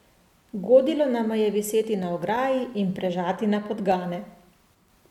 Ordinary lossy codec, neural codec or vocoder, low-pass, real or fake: MP3, 96 kbps; none; 19.8 kHz; real